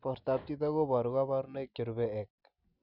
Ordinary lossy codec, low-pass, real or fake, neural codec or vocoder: none; 5.4 kHz; real; none